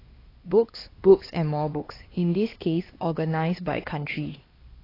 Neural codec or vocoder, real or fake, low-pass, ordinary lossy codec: codec, 16 kHz, 2 kbps, X-Codec, HuBERT features, trained on LibriSpeech; fake; 5.4 kHz; AAC, 24 kbps